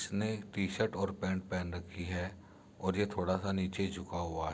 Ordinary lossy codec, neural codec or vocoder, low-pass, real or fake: none; none; none; real